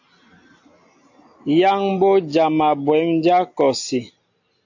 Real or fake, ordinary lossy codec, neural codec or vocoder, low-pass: real; AAC, 48 kbps; none; 7.2 kHz